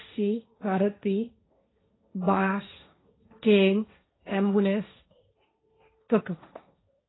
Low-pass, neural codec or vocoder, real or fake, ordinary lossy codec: 7.2 kHz; codec, 16 kHz, 1.1 kbps, Voila-Tokenizer; fake; AAC, 16 kbps